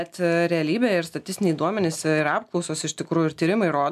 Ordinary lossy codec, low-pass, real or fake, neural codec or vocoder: MP3, 96 kbps; 14.4 kHz; real; none